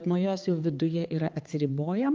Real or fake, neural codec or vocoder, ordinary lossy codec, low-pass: fake; codec, 16 kHz, 4 kbps, X-Codec, HuBERT features, trained on balanced general audio; Opus, 32 kbps; 7.2 kHz